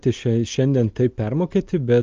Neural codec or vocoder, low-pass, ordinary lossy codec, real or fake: none; 7.2 kHz; Opus, 32 kbps; real